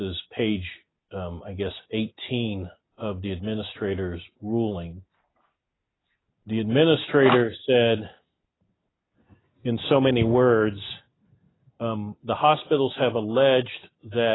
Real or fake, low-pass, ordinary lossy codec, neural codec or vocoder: real; 7.2 kHz; AAC, 16 kbps; none